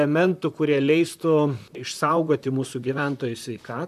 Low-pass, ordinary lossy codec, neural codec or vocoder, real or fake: 14.4 kHz; MP3, 96 kbps; vocoder, 44.1 kHz, 128 mel bands, Pupu-Vocoder; fake